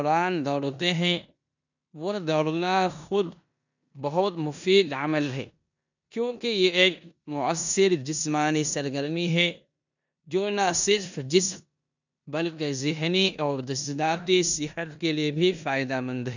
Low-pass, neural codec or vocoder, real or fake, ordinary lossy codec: 7.2 kHz; codec, 16 kHz in and 24 kHz out, 0.9 kbps, LongCat-Audio-Codec, four codebook decoder; fake; none